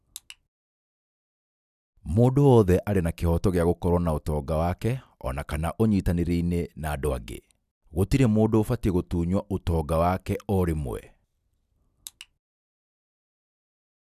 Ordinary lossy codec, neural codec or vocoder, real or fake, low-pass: none; none; real; 14.4 kHz